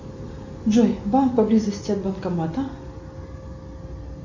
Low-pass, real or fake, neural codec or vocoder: 7.2 kHz; real; none